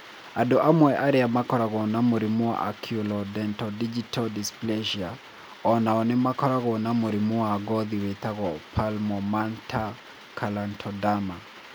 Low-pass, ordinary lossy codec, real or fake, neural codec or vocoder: none; none; real; none